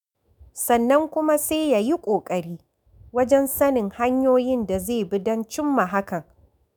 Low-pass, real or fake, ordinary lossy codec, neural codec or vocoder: none; fake; none; autoencoder, 48 kHz, 128 numbers a frame, DAC-VAE, trained on Japanese speech